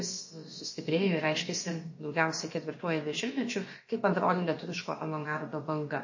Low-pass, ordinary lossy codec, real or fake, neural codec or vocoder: 7.2 kHz; MP3, 32 kbps; fake; codec, 16 kHz, about 1 kbps, DyCAST, with the encoder's durations